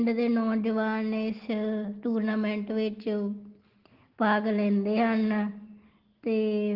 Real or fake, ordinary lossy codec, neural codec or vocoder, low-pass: real; Opus, 16 kbps; none; 5.4 kHz